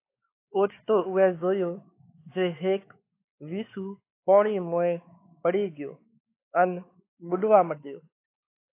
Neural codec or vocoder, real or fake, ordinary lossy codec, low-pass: codec, 16 kHz, 4 kbps, X-Codec, WavLM features, trained on Multilingual LibriSpeech; fake; AAC, 24 kbps; 3.6 kHz